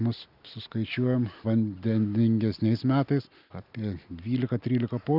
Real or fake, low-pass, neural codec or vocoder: real; 5.4 kHz; none